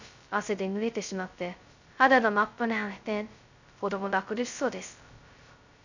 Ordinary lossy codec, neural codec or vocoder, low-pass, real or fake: none; codec, 16 kHz, 0.2 kbps, FocalCodec; 7.2 kHz; fake